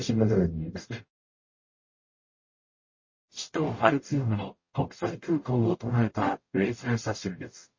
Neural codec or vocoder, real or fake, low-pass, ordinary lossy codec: codec, 44.1 kHz, 0.9 kbps, DAC; fake; 7.2 kHz; MP3, 32 kbps